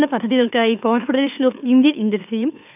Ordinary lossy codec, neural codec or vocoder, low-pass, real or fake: none; autoencoder, 44.1 kHz, a latent of 192 numbers a frame, MeloTTS; 3.6 kHz; fake